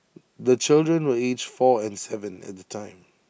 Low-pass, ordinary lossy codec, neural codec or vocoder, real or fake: none; none; none; real